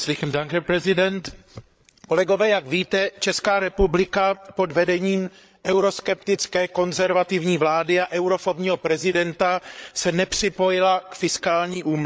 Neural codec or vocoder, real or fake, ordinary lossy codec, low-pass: codec, 16 kHz, 8 kbps, FreqCodec, larger model; fake; none; none